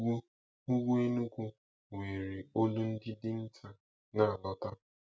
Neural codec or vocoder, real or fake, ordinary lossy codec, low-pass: none; real; none; none